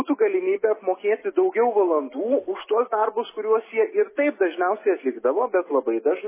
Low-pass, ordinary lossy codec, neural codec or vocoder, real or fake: 3.6 kHz; MP3, 16 kbps; none; real